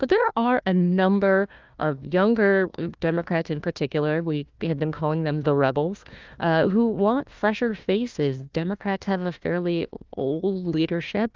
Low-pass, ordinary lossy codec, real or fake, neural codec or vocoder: 7.2 kHz; Opus, 24 kbps; fake; codec, 16 kHz, 1 kbps, FunCodec, trained on Chinese and English, 50 frames a second